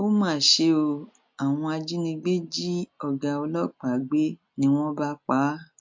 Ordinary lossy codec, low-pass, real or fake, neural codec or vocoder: MP3, 64 kbps; 7.2 kHz; real; none